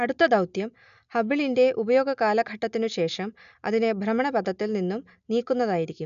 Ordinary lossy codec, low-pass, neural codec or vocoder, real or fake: none; 7.2 kHz; none; real